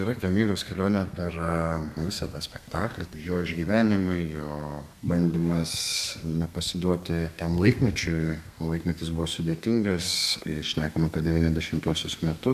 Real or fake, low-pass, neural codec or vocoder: fake; 14.4 kHz; codec, 32 kHz, 1.9 kbps, SNAC